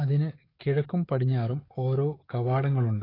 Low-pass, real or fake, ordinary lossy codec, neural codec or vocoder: 5.4 kHz; fake; AAC, 24 kbps; codec, 44.1 kHz, 7.8 kbps, DAC